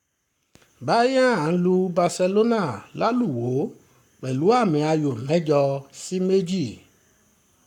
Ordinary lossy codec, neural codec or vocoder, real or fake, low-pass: none; codec, 44.1 kHz, 7.8 kbps, Pupu-Codec; fake; 19.8 kHz